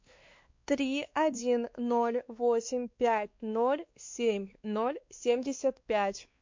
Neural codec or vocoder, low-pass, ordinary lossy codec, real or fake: codec, 16 kHz, 2 kbps, X-Codec, WavLM features, trained on Multilingual LibriSpeech; 7.2 kHz; MP3, 48 kbps; fake